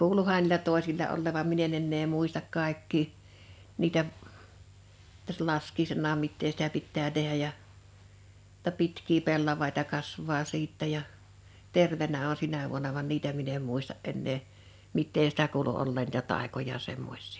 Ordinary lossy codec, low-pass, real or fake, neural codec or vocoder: none; none; real; none